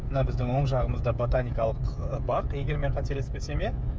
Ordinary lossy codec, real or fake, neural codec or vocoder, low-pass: none; fake; codec, 16 kHz, 16 kbps, FreqCodec, smaller model; none